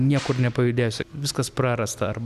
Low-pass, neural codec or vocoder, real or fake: 14.4 kHz; none; real